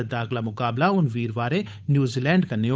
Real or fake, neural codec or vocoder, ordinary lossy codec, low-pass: fake; codec, 16 kHz, 8 kbps, FunCodec, trained on Chinese and English, 25 frames a second; none; none